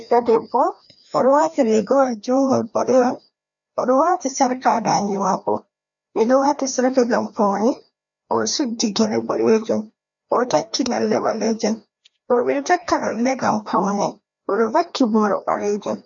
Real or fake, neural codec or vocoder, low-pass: fake; codec, 16 kHz, 1 kbps, FreqCodec, larger model; 7.2 kHz